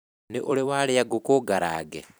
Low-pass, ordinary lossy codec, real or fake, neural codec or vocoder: none; none; fake; vocoder, 44.1 kHz, 128 mel bands every 256 samples, BigVGAN v2